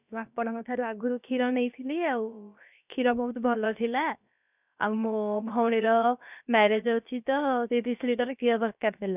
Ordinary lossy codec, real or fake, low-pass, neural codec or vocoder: none; fake; 3.6 kHz; codec, 16 kHz, about 1 kbps, DyCAST, with the encoder's durations